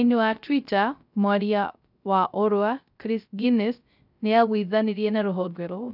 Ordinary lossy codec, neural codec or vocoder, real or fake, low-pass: none; codec, 16 kHz, 0.3 kbps, FocalCodec; fake; 5.4 kHz